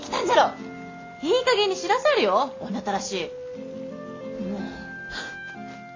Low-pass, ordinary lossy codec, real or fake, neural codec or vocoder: 7.2 kHz; AAC, 32 kbps; real; none